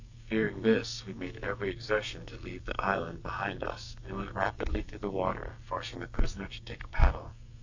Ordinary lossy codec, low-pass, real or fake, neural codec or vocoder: AAC, 48 kbps; 7.2 kHz; fake; codec, 44.1 kHz, 2.6 kbps, SNAC